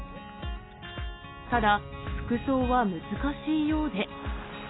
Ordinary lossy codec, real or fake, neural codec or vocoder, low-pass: AAC, 16 kbps; real; none; 7.2 kHz